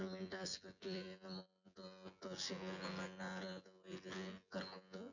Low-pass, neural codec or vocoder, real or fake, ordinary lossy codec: 7.2 kHz; vocoder, 24 kHz, 100 mel bands, Vocos; fake; none